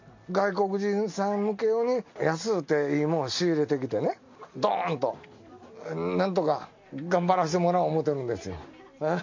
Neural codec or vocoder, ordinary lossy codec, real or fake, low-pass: none; MP3, 64 kbps; real; 7.2 kHz